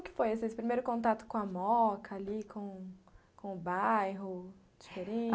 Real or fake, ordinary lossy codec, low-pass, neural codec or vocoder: real; none; none; none